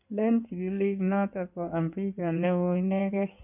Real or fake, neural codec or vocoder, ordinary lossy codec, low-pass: fake; codec, 16 kHz in and 24 kHz out, 2.2 kbps, FireRedTTS-2 codec; none; 3.6 kHz